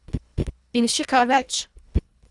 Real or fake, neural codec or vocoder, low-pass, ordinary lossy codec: fake; codec, 24 kHz, 1.5 kbps, HILCodec; 10.8 kHz; Opus, 64 kbps